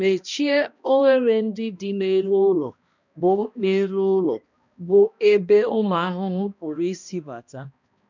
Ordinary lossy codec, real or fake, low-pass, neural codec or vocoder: none; fake; 7.2 kHz; codec, 16 kHz, 1 kbps, X-Codec, HuBERT features, trained on balanced general audio